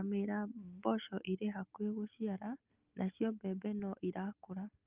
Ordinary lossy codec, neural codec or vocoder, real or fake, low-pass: Opus, 24 kbps; none; real; 3.6 kHz